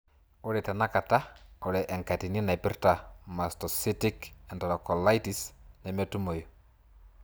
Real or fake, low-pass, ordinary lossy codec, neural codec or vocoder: real; none; none; none